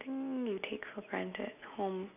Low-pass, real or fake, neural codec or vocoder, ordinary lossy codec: 3.6 kHz; real; none; none